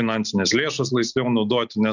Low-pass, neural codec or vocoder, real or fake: 7.2 kHz; none; real